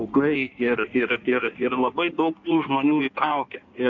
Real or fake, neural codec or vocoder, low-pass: fake; codec, 16 kHz in and 24 kHz out, 1.1 kbps, FireRedTTS-2 codec; 7.2 kHz